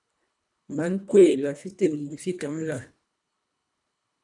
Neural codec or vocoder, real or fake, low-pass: codec, 24 kHz, 1.5 kbps, HILCodec; fake; 10.8 kHz